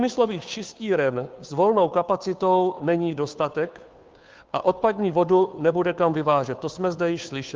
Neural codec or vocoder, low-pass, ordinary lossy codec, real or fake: codec, 16 kHz, 2 kbps, FunCodec, trained on Chinese and English, 25 frames a second; 7.2 kHz; Opus, 24 kbps; fake